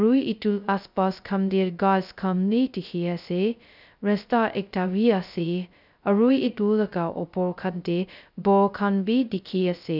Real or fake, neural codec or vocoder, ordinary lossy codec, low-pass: fake; codec, 16 kHz, 0.2 kbps, FocalCodec; none; 5.4 kHz